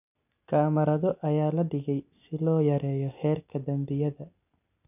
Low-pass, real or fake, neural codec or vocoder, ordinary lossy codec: 3.6 kHz; real; none; none